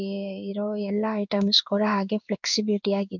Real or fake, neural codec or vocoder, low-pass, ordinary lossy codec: fake; codec, 16 kHz in and 24 kHz out, 1 kbps, XY-Tokenizer; 7.2 kHz; none